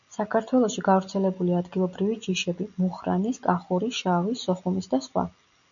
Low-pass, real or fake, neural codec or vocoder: 7.2 kHz; real; none